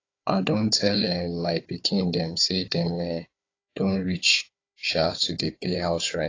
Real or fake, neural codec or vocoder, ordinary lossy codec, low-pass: fake; codec, 16 kHz, 4 kbps, FunCodec, trained on Chinese and English, 50 frames a second; AAC, 32 kbps; 7.2 kHz